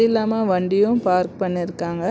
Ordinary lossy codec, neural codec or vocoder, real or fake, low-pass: none; none; real; none